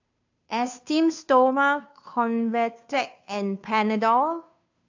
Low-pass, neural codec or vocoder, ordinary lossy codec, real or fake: 7.2 kHz; codec, 16 kHz, 2 kbps, FunCodec, trained on Chinese and English, 25 frames a second; AAC, 48 kbps; fake